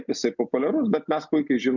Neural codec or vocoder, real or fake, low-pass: none; real; 7.2 kHz